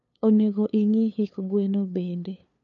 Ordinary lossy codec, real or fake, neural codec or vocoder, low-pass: none; fake; codec, 16 kHz, 2 kbps, FunCodec, trained on LibriTTS, 25 frames a second; 7.2 kHz